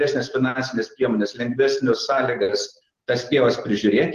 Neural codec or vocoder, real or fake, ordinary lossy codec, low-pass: none; real; Opus, 16 kbps; 14.4 kHz